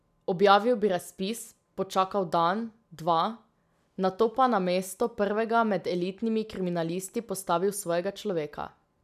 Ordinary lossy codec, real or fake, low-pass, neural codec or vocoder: none; real; 14.4 kHz; none